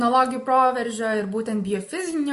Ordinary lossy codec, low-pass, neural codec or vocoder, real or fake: MP3, 48 kbps; 14.4 kHz; none; real